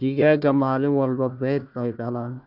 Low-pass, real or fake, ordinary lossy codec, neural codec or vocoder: 5.4 kHz; fake; none; codec, 16 kHz, 1 kbps, FunCodec, trained on Chinese and English, 50 frames a second